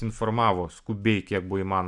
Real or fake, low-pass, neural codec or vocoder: real; 10.8 kHz; none